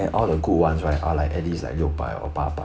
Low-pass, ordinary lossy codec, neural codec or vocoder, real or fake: none; none; none; real